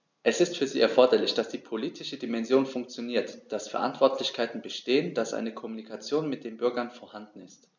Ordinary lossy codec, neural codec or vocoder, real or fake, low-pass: none; none; real; 7.2 kHz